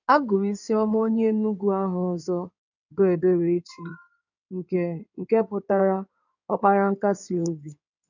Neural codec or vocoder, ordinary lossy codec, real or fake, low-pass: codec, 16 kHz in and 24 kHz out, 2.2 kbps, FireRedTTS-2 codec; none; fake; 7.2 kHz